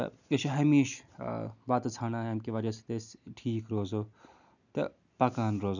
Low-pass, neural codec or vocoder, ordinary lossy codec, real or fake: 7.2 kHz; none; none; real